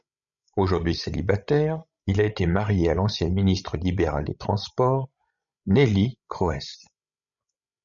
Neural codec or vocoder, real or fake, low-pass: codec, 16 kHz, 16 kbps, FreqCodec, larger model; fake; 7.2 kHz